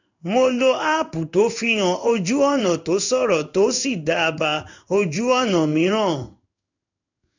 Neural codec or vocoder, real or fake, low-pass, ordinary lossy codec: codec, 16 kHz in and 24 kHz out, 1 kbps, XY-Tokenizer; fake; 7.2 kHz; none